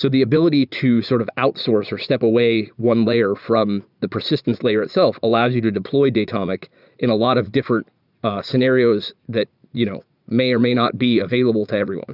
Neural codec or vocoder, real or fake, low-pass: vocoder, 44.1 kHz, 80 mel bands, Vocos; fake; 5.4 kHz